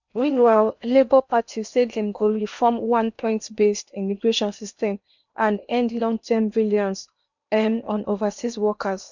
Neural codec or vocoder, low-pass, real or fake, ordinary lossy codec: codec, 16 kHz in and 24 kHz out, 0.8 kbps, FocalCodec, streaming, 65536 codes; 7.2 kHz; fake; none